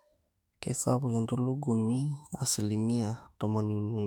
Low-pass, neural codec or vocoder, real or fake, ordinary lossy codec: 19.8 kHz; autoencoder, 48 kHz, 32 numbers a frame, DAC-VAE, trained on Japanese speech; fake; none